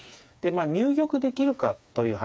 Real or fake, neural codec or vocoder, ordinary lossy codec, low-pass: fake; codec, 16 kHz, 4 kbps, FreqCodec, smaller model; none; none